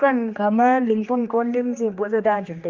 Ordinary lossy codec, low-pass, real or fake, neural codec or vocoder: Opus, 32 kbps; 7.2 kHz; fake; codec, 16 kHz, 2 kbps, X-Codec, HuBERT features, trained on general audio